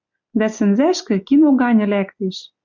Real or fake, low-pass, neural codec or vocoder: real; 7.2 kHz; none